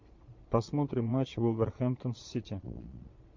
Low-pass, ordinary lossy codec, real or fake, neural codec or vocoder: 7.2 kHz; MP3, 48 kbps; fake; vocoder, 22.05 kHz, 80 mel bands, Vocos